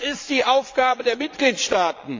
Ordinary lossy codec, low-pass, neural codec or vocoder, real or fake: AAC, 48 kbps; 7.2 kHz; vocoder, 22.05 kHz, 80 mel bands, Vocos; fake